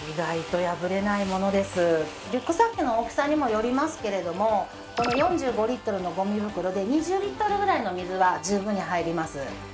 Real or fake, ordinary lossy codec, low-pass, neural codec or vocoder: real; none; none; none